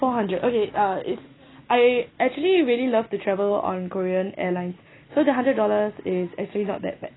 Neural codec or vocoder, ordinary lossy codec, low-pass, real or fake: none; AAC, 16 kbps; 7.2 kHz; real